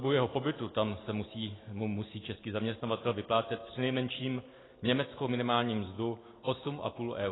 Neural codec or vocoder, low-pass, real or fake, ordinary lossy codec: none; 7.2 kHz; real; AAC, 16 kbps